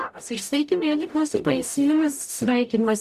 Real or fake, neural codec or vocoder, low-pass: fake; codec, 44.1 kHz, 0.9 kbps, DAC; 14.4 kHz